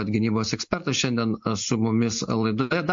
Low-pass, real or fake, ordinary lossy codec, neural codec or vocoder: 7.2 kHz; real; MP3, 48 kbps; none